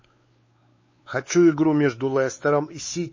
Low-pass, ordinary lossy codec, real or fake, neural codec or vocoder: 7.2 kHz; MP3, 32 kbps; fake; codec, 16 kHz, 4 kbps, X-Codec, WavLM features, trained on Multilingual LibriSpeech